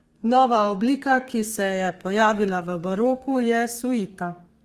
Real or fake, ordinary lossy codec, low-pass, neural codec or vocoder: fake; Opus, 24 kbps; 14.4 kHz; codec, 32 kHz, 1.9 kbps, SNAC